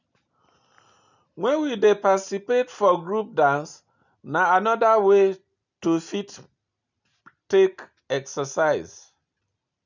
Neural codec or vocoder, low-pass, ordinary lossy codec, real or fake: none; 7.2 kHz; none; real